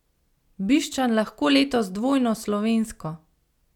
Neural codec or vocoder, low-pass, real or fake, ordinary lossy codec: none; 19.8 kHz; real; none